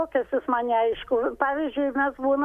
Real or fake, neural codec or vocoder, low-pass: real; none; 14.4 kHz